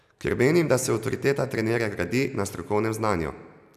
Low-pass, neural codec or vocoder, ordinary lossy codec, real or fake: 14.4 kHz; none; none; real